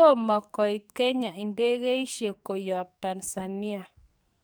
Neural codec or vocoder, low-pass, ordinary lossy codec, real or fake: codec, 44.1 kHz, 2.6 kbps, SNAC; none; none; fake